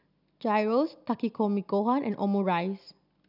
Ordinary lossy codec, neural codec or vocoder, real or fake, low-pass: none; none; real; 5.4 kHz